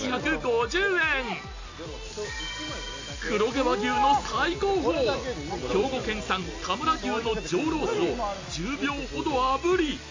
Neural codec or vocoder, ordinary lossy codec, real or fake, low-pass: none; AAC, 48 kbps; real; 7.2 kHz